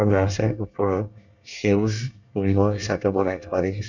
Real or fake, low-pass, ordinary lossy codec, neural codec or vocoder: fake; 7.2 kHz; none; codec, 24 kHz, 1 kbps, SNAC